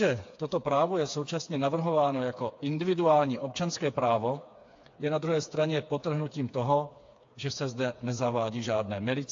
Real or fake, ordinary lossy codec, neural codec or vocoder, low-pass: fake; AAC, 48 kbps; codec, 16 kHz, 4 kbps, FreqCodec, smaller model; 7.2 kHz